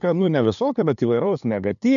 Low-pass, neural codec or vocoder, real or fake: 7.2 kHz; codec, 16 kHz, 2 kbps, FunCodec, trained on LibriTTS, 25 frames a second; fake